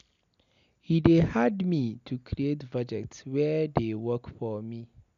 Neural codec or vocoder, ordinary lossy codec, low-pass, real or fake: none; none; 7.2 kHz; real